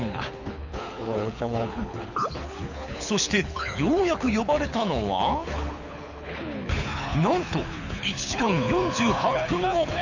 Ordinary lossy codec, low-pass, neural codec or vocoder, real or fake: none; 7.2 kHz; codec, 24 kHz, 6 kbps, HILCodec; fake